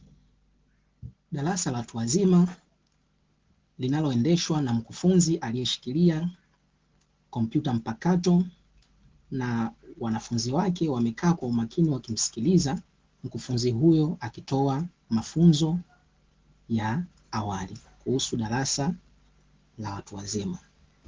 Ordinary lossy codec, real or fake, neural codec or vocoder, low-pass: Opus, 16 kbps; real; none; 7.2 kHz